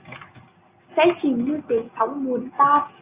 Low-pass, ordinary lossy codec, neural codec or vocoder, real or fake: 3.6 kHz; Opus, 64 kbps; none; real